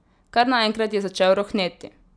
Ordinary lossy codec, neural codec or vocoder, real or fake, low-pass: none; none; real; 9.9 kHz